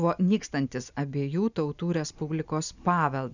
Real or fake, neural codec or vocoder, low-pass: real; none; 7.2 kHz